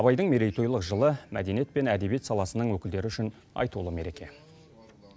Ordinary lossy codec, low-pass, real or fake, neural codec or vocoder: none; none; real; none